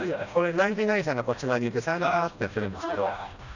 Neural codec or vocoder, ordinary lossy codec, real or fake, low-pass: codec, 16 kHz, 1 kbps, FreqCodec, smaller model; none; fake; 7.2 kHz